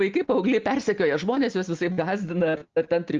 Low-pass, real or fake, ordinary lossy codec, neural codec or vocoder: 7.2 kHz; real; Opus, 32 kbps; none